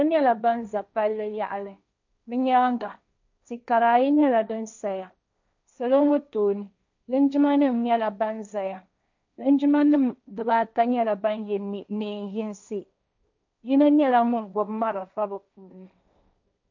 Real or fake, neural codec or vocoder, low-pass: fake; codec, 16 kHz, 1.1 kbps, Voila-Tokenizer; 7.2 kHz